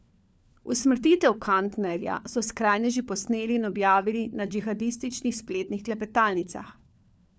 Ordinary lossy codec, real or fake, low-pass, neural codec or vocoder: none; fake; none; codec, 16 kHz, 4 kbps, FunCodec, trained on LibriTTS, 50 frames a second